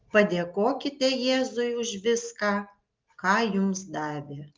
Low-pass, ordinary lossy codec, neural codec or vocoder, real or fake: 7.2 kHz; Opus, 32 kbps; none; real